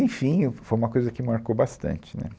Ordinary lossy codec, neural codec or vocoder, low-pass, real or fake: none; none; none; real